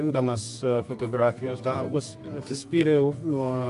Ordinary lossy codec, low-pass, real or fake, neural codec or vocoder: AAC, 64 kbps; 10.8 kHz; fake; codec, 24 kHz, 0.9 kbps, WavTokenizer, medium music audio release